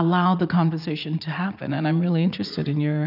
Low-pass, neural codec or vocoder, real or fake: 5.4 kHz; vocoder, 44.1 kHz, 80 mel bands, Vocos; fake